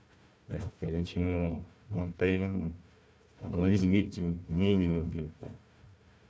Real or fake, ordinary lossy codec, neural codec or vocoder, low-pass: fake; none; codec, 16 kHz, 1 kbps, FunCodec, trained on Chinese and English, 50 frames a second; none